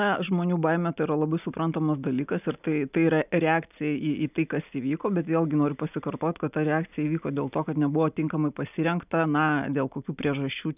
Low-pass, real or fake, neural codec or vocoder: 3.6 kHz; real; none